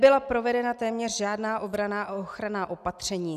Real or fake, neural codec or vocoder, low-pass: real; none; 14.4 kHz